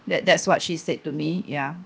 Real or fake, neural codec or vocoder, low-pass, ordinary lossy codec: fake; codec, 16 kHz, 0.7 kbps, FocalCodec; none; none